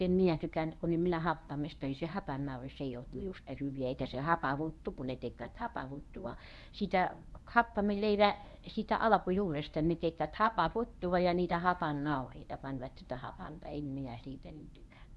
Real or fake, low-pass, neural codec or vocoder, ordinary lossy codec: fake; none; codec, 24 kHz, 0.9 kbps, WavTokenizer, medium speech release version 1; none